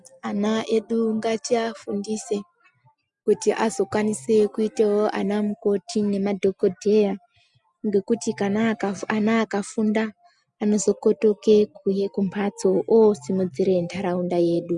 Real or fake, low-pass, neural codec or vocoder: real; 10.8 kHz; none